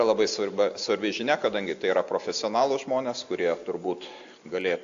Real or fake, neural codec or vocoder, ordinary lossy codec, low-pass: real; none; AAC, 64 kbps; 7.2 kHz